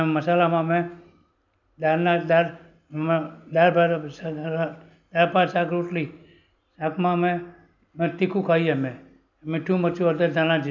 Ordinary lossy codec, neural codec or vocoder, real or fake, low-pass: none; none; real; 7.2 kHz